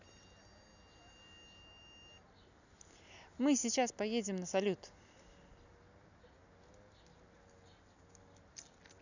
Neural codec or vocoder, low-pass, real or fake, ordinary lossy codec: none; 7.2 kHz; real; none